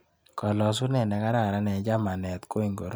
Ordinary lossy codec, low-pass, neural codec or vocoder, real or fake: none; none; none; real